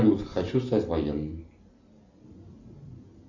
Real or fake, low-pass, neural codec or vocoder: real; 7.2 kHz; none